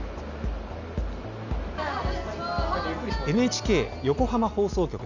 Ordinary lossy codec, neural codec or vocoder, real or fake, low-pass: none; none; real; 7.2 kHz